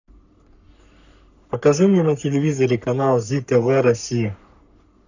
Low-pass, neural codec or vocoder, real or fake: 7.2 kHz; codec, 44.1 kHz, 3.4 kbps, Pupu-Codec; fake